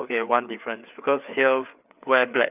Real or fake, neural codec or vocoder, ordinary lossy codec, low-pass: fake; codec, 16 kHz, 4 kbps, FreqCodec, larger model; none; 3.6 kHz